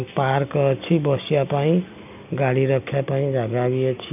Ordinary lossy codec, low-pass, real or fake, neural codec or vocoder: none; 3.6 kHz; real; none